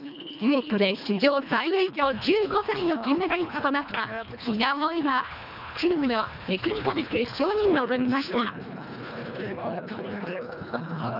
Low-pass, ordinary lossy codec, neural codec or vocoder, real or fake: 5.4 kHz; none; codec, 24 kHz, 1.5 kbps, HILCodec; fake